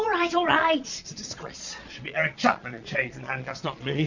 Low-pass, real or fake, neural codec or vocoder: 7.2 kHz; fake; vocoder, 22.05 kHz, 80 mel bands, Vocos